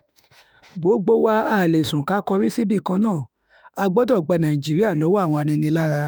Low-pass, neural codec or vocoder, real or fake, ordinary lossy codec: none; autoencoder, 48 kHz, 32 numbers a frame, DAC-VAE, trained on Japanese speech; fake; none